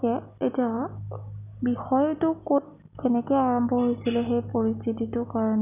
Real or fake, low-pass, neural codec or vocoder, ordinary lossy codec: real; 3.6 kHz; none; none